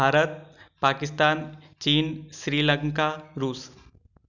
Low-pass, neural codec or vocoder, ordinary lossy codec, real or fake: 7.2 kHz; none; none; real